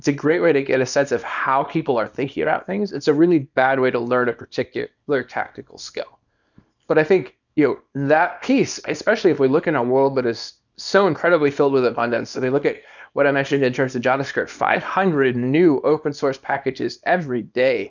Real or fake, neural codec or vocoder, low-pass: fake; codec, 24 kHz, 0.9 kbps, WavTokenizer, small release; 7.2 kHz